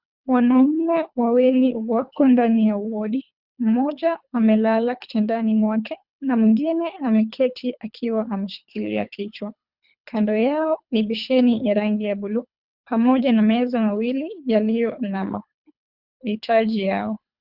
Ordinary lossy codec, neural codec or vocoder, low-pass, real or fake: Opus, 64 kbps; codec, 24 kHz, 3 kbps, HILCodec; 5.4 kHz; fake